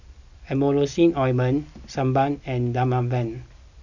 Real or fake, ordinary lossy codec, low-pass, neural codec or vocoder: real; none; 7.2 kHz; none